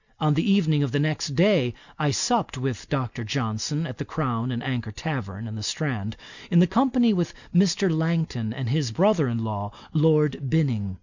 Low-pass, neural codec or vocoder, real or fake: 7.2 kHz; none; real